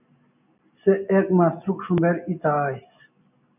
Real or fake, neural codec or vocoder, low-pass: real; none; 3.6 kHz